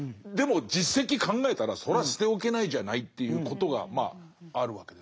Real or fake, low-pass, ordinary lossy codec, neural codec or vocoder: real; none; none; none